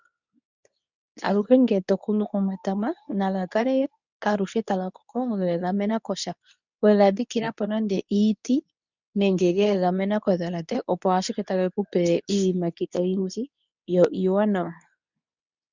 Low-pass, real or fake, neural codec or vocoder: 7.2 kHz; fake; codec, 24 kHz, 0.9 kbps, WavTokenizer, medium speech release version 2